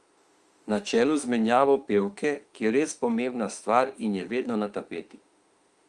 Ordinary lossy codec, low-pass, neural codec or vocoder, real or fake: Opus, 32 kbps; 10.8 kHz; autoencoder, 48 kHz, 32 numbers a frame, DAC-VAE, trained on Japanese speech; fake